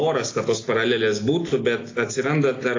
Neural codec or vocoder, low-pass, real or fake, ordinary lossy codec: none; 7.2 kHz; real; AAC, 32 kbps